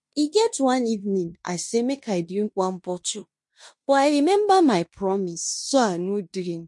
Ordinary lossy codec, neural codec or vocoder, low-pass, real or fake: MP3, 48 kbps; codec, 16 kHz in and 24 kHz out, 0.9 kbps, LongCat-Audio-Codec, fine tuned four codebook decoder; 10.8 kHz; fake